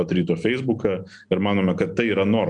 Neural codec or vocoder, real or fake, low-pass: none; real; 9.9 kHz